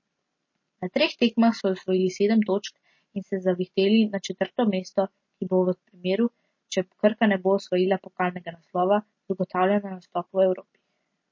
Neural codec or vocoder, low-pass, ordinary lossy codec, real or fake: none; 7.2 kHz; MP3, 32 kbps; real